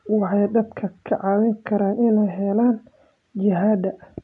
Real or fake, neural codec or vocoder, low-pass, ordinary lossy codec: real; none; 10.8 kHz; AAC, 64 kbps